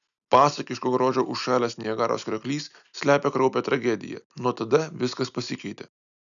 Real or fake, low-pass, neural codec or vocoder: real; 7.2 kHz; none